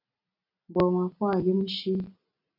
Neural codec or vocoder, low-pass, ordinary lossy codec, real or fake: none; 5.4 kHz; AAC, 48 kbps; real